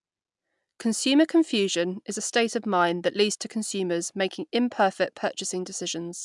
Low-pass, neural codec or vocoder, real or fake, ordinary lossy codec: 10.8 kHz; none; real; MP3, 96 kbps